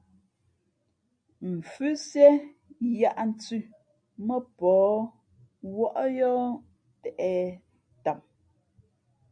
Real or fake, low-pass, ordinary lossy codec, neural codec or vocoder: real; 9.9 kHz; MP3, 48 kbps; none